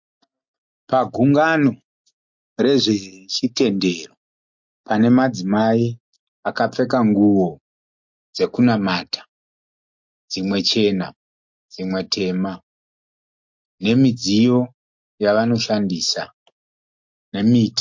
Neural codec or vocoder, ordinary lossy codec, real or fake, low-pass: none; MP3, 48 kbps; real; 7.2 kHz